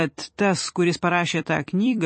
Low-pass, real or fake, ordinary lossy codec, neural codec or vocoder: 9.9 kHz; fake; MP3, 32 kbps; vocoder, 44.1 kHz, 128 mel bands every 256 samples, BigVGAN v2